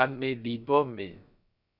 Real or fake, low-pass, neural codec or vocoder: fake; 5.4 kHz; codec, 16 kHz, about 1 kbps, DyCAST, with the encoder's durations